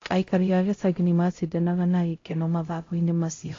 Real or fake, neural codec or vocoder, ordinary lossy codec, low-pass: fake; codec, 16 kHz, 0.5 kbps, X-Codec, HuBERT features, trained on LibriSpeech; AAC, 32 kbps; 7.2 kHz